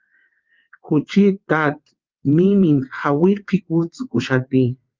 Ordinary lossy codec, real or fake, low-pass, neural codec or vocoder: Opus, 32 kbps; fake; 7.2 kHz; vocoder, 22.05 kHz, 80 mel bands, WaveNeXt